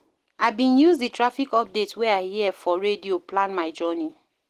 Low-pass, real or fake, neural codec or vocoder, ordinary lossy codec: 14.4 kHz; real; none; Opus, 16 kbps